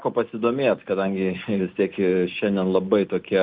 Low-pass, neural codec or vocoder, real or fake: 5.4 kHz; none; real